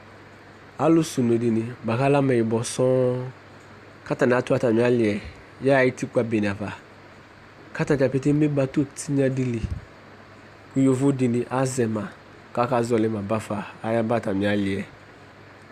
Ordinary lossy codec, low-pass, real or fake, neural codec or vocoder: Opus, 64 kbps; 14.4 kHz; real; none